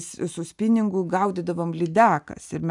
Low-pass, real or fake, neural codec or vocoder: 10.8 kHz; real; none